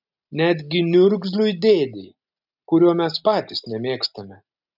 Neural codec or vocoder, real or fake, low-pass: none; real; 5.4 kHz